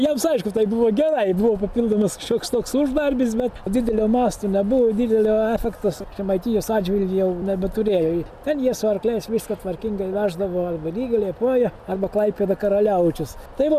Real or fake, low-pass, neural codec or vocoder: real; 14.4 kHz; none